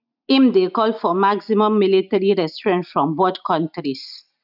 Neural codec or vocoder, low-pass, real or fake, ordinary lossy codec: none; 5.4 kHz; real; none